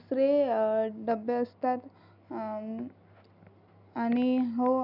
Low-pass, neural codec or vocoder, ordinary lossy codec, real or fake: 5.4 kHz; none; none; real